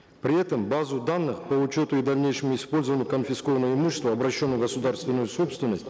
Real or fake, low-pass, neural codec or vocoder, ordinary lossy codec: real; none; none; none